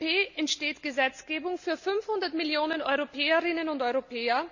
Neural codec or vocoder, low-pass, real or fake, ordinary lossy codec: none; 7.2 kHz; real; none